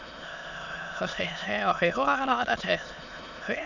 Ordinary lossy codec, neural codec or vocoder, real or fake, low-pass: none; autoencoder, 22.05 kHz, a latent of 192 numbers a frame, VITS, trained on many speakers; fake; 7.2 kHz